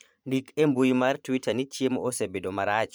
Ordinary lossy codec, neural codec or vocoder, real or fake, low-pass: none; vocoder, 44.1 kHz, 128 mel bands every 512 samples, BigVGAN v2; fake; none